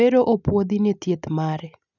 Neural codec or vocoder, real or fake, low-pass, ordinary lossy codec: none; real; 7.2 kHz; none